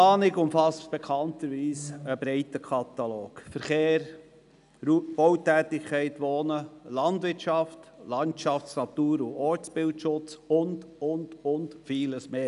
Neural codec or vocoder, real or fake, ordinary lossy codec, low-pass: none; real; none; 10.8 kHz